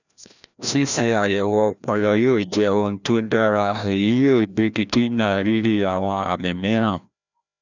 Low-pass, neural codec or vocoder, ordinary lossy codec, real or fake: 7.2 kHz; codec, 16 kHz, 1 kbps, FreqCodec, larger model; none; fake